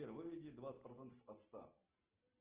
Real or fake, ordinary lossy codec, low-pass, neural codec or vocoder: real; Opus, 16 kbps; 3.6 kHz; none